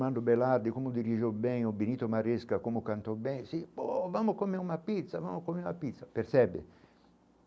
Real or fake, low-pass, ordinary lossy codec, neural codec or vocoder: real; none; none; none